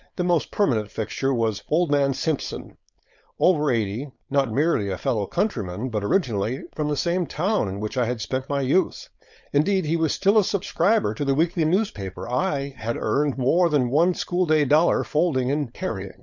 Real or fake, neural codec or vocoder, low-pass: fake; codec, 16 kHz, 4.8 kbps, FACodec; 7.2 kHz